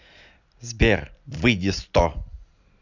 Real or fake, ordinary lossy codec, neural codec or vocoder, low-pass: real; none; none; 7.2 kHz